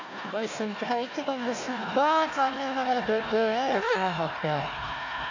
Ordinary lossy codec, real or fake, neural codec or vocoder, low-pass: AAC, 48 kbps; fake; codec, 16 kHz, 1 kbps, FunCodec, trained on Chinese and English, 50 frames a second; 7.2 kHz